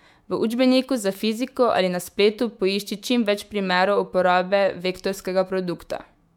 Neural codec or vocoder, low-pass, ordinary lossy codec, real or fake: autoencoder, 48 kHz, 128 numbers a frame, DAC-VAE, trained on Japanese speech; 19.8 kHz; MP3, 96 kbps; fake